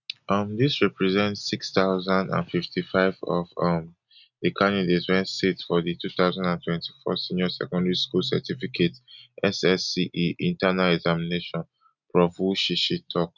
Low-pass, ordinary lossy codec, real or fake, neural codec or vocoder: 7.2 kHz; none; real; none